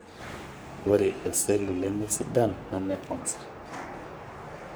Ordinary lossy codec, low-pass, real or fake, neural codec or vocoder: none; none; fake; codec, 44.1 kHz, 3.4 kbps, Pupu-Codec